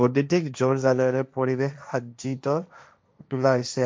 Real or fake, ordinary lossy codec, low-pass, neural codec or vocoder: fake; none; none; codec, 16 kHz, 1.1 kbps, Voila-Tokenizer